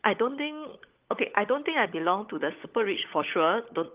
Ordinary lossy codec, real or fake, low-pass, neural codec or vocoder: Opus, 32 kbps; fake; 3.6 kHz; codec, 16 kHz, 16 kbps, FunCodec, trained on LibriTTS, 50 frames a second